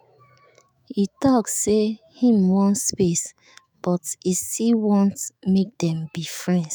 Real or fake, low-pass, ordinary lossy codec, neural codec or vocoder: fake; none; none; autoencoder, 48 kHz, 128 numbers a frame, DAC-VAE, trained on Japanese speech